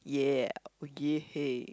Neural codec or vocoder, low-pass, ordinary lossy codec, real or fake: none; none; none; real